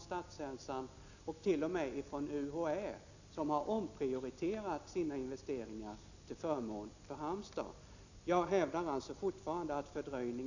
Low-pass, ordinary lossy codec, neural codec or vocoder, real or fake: 7.2 kHz; none; none; real